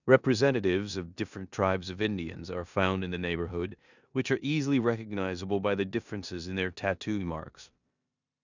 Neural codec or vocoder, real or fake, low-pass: codec, 16 kHz in and 24 kHz out, 0.9 kbps, LongCat-Audio-Codec, four codebook decoder; fake; 7.2 kHz